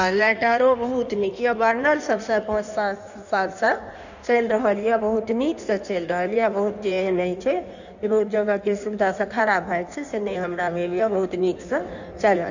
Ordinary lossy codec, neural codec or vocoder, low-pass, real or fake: none; codec, 16 kHz in and 24 kHz out, 1.1 kbps, FireRedTTS-2 codec; 7.2 kHz; fake